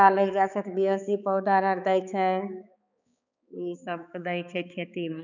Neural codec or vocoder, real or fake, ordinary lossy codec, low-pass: codec, 16 kHz, 4 kbps, X-Codec, HuBERT features, trained on balanced general audio; fake; none; 7.2 kHz